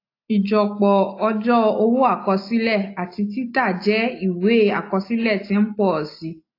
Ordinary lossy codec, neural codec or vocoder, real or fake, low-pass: AAC, 32 kbps; none; real; 5.4 kHz